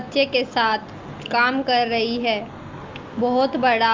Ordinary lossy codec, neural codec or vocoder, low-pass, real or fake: Opus, 24 kbps; none; 7.2 kHz; real